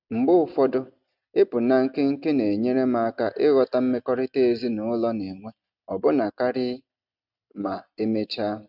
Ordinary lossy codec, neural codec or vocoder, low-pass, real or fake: none; none; 5.4 kHz; real